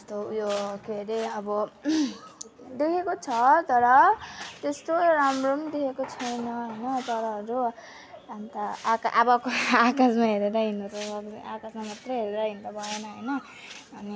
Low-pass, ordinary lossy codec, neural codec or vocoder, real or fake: none; none; none; real